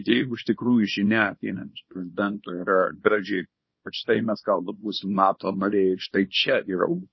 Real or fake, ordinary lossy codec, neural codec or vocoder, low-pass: fake; MP3, 24 kbps; codec, 24 kHz, 0.9 kbps, WavTokenizer, small release; 7.2 kHz